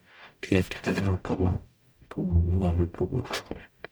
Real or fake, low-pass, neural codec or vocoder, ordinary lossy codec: fake; none; codec, 44.1 kHz, 0.9 kbps, DAC; none